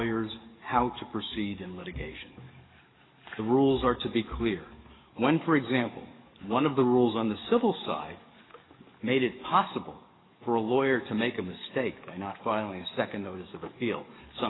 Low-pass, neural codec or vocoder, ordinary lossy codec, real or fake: 7.2 kHz; codec, 44.1 kHz, 7.8 kbps, DAC; AAC, 16 kbps; fake